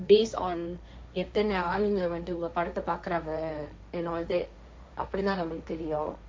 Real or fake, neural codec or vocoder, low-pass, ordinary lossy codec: fake; codec, 16 kHz, 1.1 kbps, Voila-Tokenizer; none; none